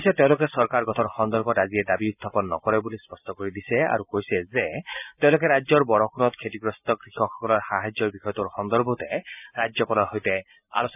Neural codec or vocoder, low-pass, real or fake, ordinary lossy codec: none; 3.6 kHz; real; none